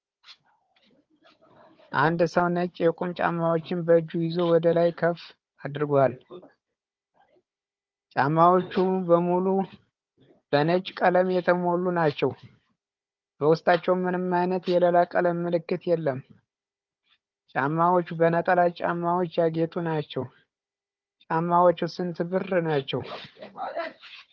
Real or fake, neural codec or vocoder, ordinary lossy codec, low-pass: fake; codec, 16 kHz, 4 kbps, FunCodec, trained on Chinese and English, 50 frames a second; Opus, 24 kbps; 7.2 kHz